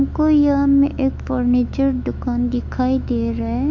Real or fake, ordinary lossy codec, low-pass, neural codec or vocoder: fake; MP3, 48 kbps; 7.2 kHz; autoencoder, 48 kHz, 128 numbers a frame, DAC-VAE, trained on Japanese speech